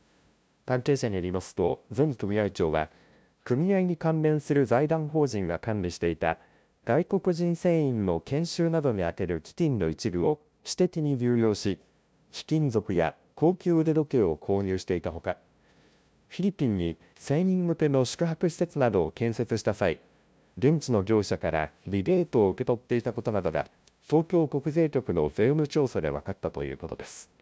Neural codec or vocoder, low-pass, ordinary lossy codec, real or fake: codec, 16 kHz, 0.5 kbps, FunCodec, trained on LibriTTS, 25 frames a second; none; none; fake